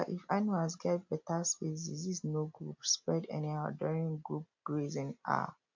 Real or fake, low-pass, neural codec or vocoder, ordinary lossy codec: real; 7.2 kHz; none; MP3, 48 kbps